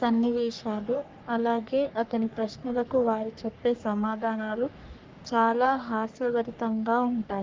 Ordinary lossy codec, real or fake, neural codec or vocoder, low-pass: Opus, 24 kbps; fake; codec, 44.1 kHz, 3.4 kbps, Pupu-Codec; 7.2 kHz